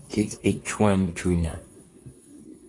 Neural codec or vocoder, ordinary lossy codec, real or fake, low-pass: codec, 24 kHz, 1 kbps, SNAC; AAC, 32 kbps; fake; 10.8 kHz